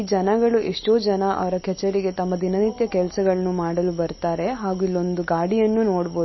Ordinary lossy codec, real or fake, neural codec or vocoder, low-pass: MP3, 24 kbps; real; none; 7.2 kHz